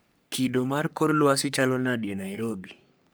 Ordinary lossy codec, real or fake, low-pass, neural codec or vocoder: none; fake; none; codec, 44.1 kHz, 3.4 kbps, Pupu-Codec